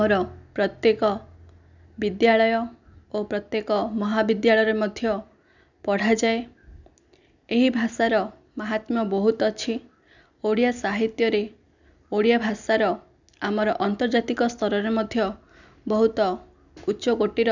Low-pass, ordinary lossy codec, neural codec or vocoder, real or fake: 7.2 kHz; none; none; real